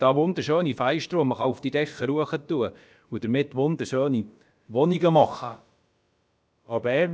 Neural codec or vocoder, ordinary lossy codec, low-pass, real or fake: codec, 16 kHz, about 1 kbps, DyCAST, with the encoder's durations; none; none; fake